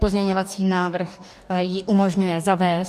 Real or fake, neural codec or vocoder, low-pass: fake; codec, 44.1 kHz, 2.6 kbps, DAC; 14.4 kHz